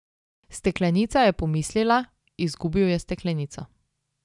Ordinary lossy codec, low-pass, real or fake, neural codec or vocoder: none; 10.8 kHz; real; none